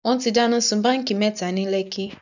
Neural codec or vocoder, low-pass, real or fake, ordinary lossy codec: none; 7.2 kHz; real; none